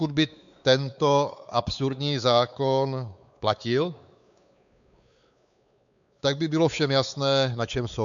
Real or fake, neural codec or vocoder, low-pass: fake; codec, 16 kHz, 4 kbps, X-Codec, WavLM features, trained on Multilingual LibriSpeech; 7.2 kHz